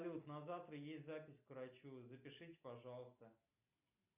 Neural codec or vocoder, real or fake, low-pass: none; real; 3.6 kHz